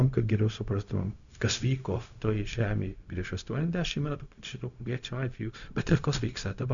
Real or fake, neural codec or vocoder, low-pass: fake; codec, 16 kHz, 0.4 kbps, LongCat-Audio-Codec; 7.2 kHz